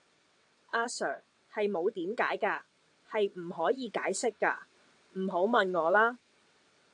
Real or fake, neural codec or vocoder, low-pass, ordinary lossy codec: fake; vocoder, 22.05 kHz, 80 mel bands, WaveNeXt; 9.9 kHz; AAC, 64 kbps